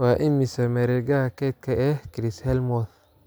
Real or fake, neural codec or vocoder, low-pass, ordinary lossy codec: real; none; none; none